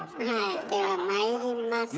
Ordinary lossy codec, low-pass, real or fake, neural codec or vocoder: none; none; fake; codec, 16 kHz, 8 kbps, FreqCodec, smaller model